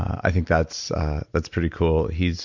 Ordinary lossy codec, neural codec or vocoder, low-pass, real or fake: MP3, 64 kbps; none; 7.2 kHz; real